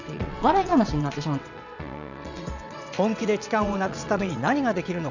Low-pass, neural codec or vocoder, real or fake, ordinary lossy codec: 7.2 kHz; vocoder, 22.05 kHz, 80 mel bands, WaveNeXt; fake; none